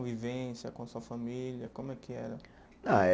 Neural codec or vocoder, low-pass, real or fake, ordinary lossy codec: none; none; real; none